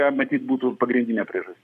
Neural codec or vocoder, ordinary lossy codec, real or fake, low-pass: codec, 44.1 kHz, 7.8 kbps, Pupu-Codec; MP3, 96 kbps; fake; 14.4 kHz